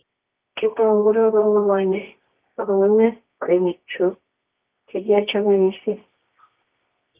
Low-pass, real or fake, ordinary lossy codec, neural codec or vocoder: 3.6 kHz; fake; Opus, 32 kbps; codec, 24 kHz, 0.9 kbps, WavTokenizer, medium music audio release